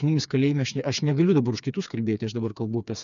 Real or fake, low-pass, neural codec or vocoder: fake; 7.2 kHz; codec, 16 kHz, 4 kbps, FreqCodec, smaller model